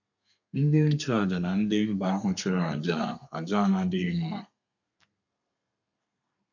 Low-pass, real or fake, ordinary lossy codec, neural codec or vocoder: 7.2 kHz; fake; none; codec, 32 kHz, 1.9 kbps, SNAC